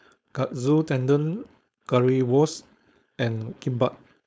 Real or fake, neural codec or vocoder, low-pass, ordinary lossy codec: fake; codec, 16 kHz, 4.8 kbps, FACodec; none; none